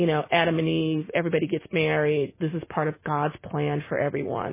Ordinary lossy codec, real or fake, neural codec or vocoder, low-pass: MP3, 16 kbps; real; none; 3.6 kHz